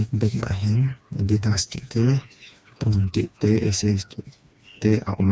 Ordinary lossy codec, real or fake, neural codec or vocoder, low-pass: none; fake; codec, 16 kHz, 2 kbps, FreqCodec, smaller model; none